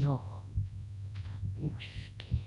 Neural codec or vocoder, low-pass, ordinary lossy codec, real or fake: codec, 24 kHz, 0.9 kbps, WavTokenizer, large speech release; 10.8 kHz; none; fake